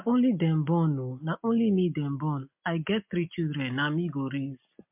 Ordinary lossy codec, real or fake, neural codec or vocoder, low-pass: MP3, 32 kbps; real; none; 3.6 kHz